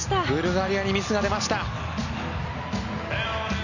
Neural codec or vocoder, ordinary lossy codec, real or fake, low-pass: none; none; real; 7.2 kHz